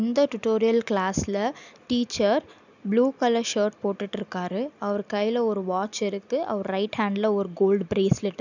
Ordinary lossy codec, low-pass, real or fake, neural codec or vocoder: none; 7.2 kHz; real; none